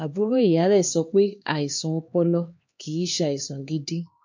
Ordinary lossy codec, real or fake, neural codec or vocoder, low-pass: MP3, 48 kbps; fake; codec, 16 kHz, 2 kbps, X-Codec, HuBERT features, trained on LibriSpeech; 7.2 kHz